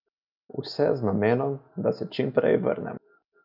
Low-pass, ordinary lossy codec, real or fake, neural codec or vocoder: 5.4 kHz; none; real; none